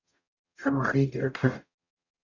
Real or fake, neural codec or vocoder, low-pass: fake; codec, 44.1 kHz, 0.9 kbps, DAC; 7.2 kHz